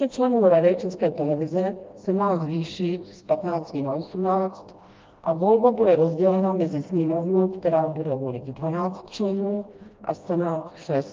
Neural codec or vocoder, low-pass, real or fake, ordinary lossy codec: codec, 16 kHz, 1 kbps, FreqCodec, smaller model; 7.2 kHz; fake; Opus, 24 kbps